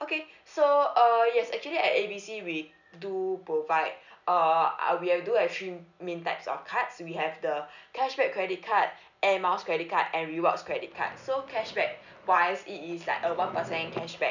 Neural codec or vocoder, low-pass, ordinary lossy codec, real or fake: none; 7.2 kHz; none; real